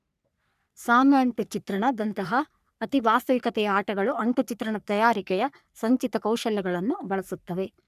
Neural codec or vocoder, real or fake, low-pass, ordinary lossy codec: codec, 44.1 kHz, 3.4 kbps, Pupu-Codec; fake; 14.4 kHz; none